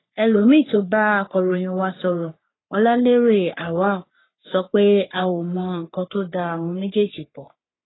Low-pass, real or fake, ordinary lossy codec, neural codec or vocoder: 7.2 kHz; fake; AAC, 16 kbps; codec, 44.1 kHz, 3.4 kbps, Pupu-Codec